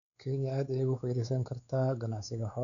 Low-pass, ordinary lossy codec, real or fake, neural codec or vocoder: 7.2 kHz; none; fake; codec, 16 kHz, 4 kbps, X-Codec, WavLM features, trained on Multilingual LibriSpeech